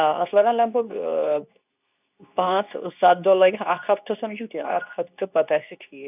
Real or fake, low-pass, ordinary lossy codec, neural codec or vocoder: fake; 3.6 kHz; none; codec, 24 kHz, 0.9 kbps, WavTokenizer, medium speech release version 2